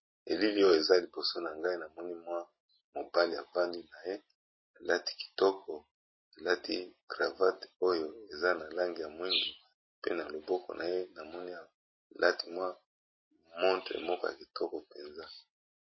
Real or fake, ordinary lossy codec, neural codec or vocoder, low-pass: real; MP3, 24 kbps; none; 7.2 kHz